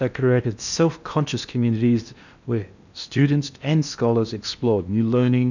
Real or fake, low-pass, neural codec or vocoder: fake; 7.2 kHz; codec, 16 kHz in and 24 kHz out, 0.8 kbps, FocalCodec, streaming, 65536 codes